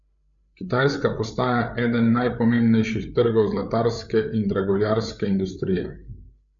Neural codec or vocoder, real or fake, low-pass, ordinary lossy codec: codec, 16 kHz, 8 kbps, FreqCodec, larger model; fake; 7.2 kHz; MP3, 64 kbps